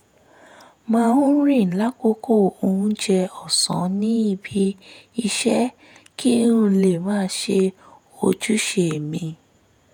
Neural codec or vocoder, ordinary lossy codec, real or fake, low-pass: vocoder, 48 kHz, 128 mel bands, Vocos; none; fake; none